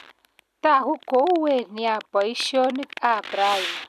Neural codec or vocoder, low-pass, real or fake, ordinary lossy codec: none; 14.4 kHz; real; none